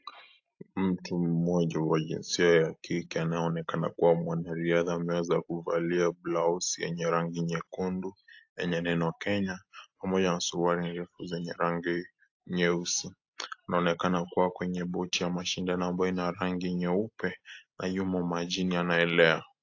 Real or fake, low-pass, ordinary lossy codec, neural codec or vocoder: real; 7.2 kHz; AAC, 48 kbps; none